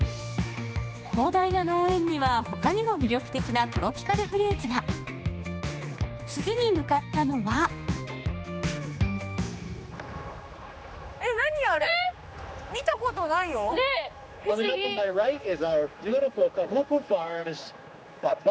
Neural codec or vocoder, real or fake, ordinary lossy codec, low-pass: codec, 16 kHz, 2 kbps, X-Codec, HuBERT features, trained on general audio; fake; none; none